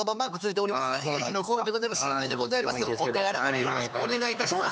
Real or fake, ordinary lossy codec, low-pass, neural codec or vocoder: fake; none; none; codec, 16 kHz, 4 kbps, X-Codec, HuBERT features, trained on LibriSpeech